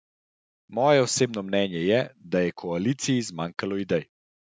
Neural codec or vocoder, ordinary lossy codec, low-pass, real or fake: none; none; none; real